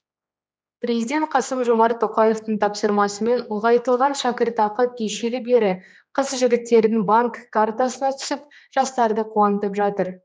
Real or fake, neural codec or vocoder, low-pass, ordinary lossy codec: fake; codec, 16 kHz, 2 kbps, X-Codec, HuBERT features, trained on general audio; none; none